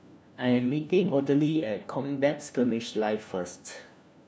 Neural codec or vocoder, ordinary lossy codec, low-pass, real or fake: codec, 16 kHz, 1 kbps, FunCodec, trained on LibriTTS, 50 frames a second; none; none; fake